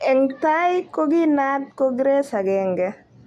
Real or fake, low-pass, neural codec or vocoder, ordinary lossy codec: real; 14.4 kHz; none; none